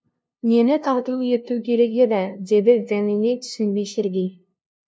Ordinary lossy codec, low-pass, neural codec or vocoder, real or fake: none; none; codec, 16 kHz, 0.5 kbps, FunCodec, trained on LibriTTS, 25 frames a second; fake